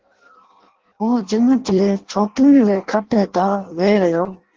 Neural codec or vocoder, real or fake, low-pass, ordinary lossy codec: codec, 16 kHz in and 24 kHz out, 0.6 kbps, FireRedTTS-2 codec; fake; 7.2 kHz; Opus, 16 kbps